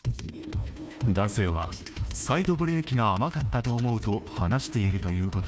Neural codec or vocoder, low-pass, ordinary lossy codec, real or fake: codec, 16 kHz, 1 kbps, FunCodec, trained on Chinese and English, 50 frames a second; none; none; fake